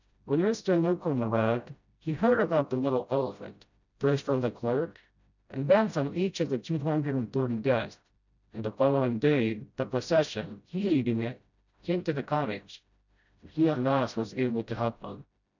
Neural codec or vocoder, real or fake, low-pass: codec, 16 kHz, 0.5 kbps, FreqCodec, smaller model; fake; 7.2 kHz